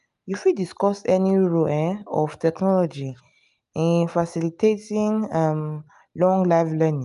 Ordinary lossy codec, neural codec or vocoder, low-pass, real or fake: none; none; 10.8 kHz; real